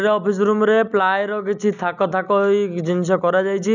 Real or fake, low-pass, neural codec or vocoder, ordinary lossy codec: real; 7.2 kHz; none; none